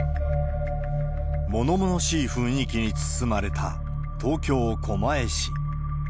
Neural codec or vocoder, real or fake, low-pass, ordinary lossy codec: none; real; none; none